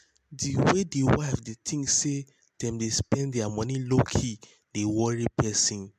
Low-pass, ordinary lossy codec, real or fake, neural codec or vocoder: 14.4 kHz; MP3, 96 kbps; real; none